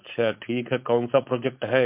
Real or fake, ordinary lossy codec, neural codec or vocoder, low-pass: fake; MP3, 32 kbps; codec, 16 kHz, 4.8 kbps, FACodec; 3.6 kHz